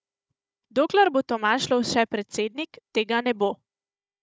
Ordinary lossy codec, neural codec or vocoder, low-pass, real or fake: none; codec, 16 kHz, 16 kbps, FunCodec, trained on Chinese and English, 50 frames a second; none; fake